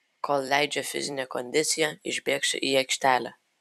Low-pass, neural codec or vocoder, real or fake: 14.4 kHz; vocoder, 44.1 kHz, 128 mel bands every 256 samples, BigVGAN v2; fake